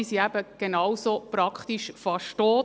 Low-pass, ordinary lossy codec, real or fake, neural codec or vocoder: none; none; real; none